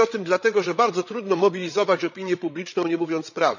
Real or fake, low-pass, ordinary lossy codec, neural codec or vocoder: fake; 7.2 kHz; none; codec, 16 kHz, 8 kbps, FreqCodec, larger model